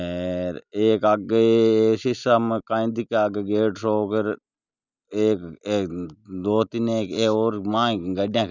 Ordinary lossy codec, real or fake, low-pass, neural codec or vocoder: none; real; 7.2 kHz; none